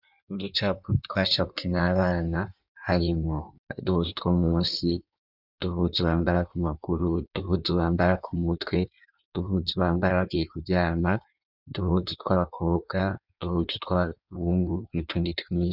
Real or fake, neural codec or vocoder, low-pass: fake; codec, 16 kHz in and 24 kHz out, 1.1 kbps, FireRedTTS-2 codec; 5.4 kHz